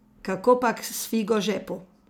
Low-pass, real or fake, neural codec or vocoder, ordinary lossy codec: none; real; none; none